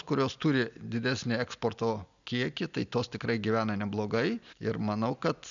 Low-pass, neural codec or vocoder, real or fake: 7.2 kHz; none; real